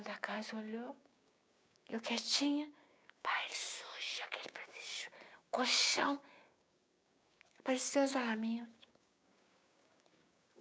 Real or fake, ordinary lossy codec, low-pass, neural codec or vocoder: fake; none; none; codec, 16 kHz, 6 kbps, DAC